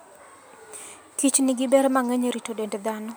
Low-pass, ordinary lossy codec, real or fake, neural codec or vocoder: none; none; real; none